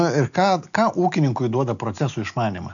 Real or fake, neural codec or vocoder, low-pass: real; none; 7.2 kHz